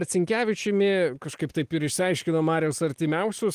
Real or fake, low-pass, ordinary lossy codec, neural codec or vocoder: real; 14.4 kHz; Opus, 24 kbps; none